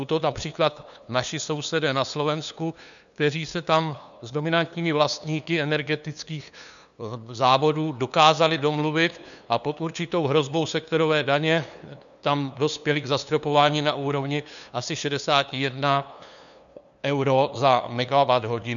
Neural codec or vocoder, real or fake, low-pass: codec, 16 kHz, 2 kbps, FunCodec, trained on LibriTTS, 25 frames a second; fake; 7.2 kHz